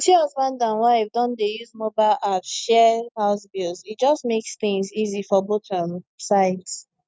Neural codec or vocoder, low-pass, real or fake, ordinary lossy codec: none; none; real; none